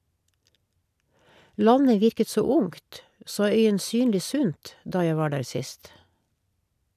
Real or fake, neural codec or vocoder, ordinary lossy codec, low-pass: real; none; none; 14.4 kHz